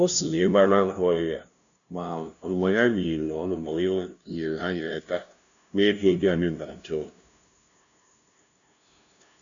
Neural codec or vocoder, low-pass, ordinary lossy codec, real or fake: codec, 16 kHz, 0.5 kbps, FunCodec, trained on LibriTTS, 25 frames a second; 7.2 kHz; none; fake